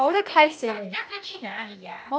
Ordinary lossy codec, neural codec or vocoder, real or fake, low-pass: none; codec, 16 kHz, 0.8 kbps, ZipCodec; fake; none